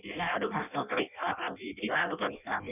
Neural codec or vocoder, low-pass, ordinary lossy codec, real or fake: codec, 16 kHz in and 24 kHz out, 0.6 kbps, FireRedTTS-2 codec; 3.6 kHz; none; fake